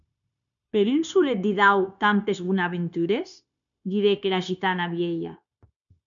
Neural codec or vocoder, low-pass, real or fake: codec, 16 kHz, 0.9 kbps, LongCat-Audio-Codec; 7.2 kHz; fake